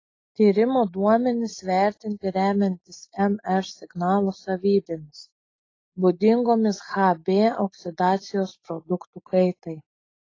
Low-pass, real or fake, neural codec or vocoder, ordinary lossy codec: 7.2 kHz; real; none; AAC, 32 kbps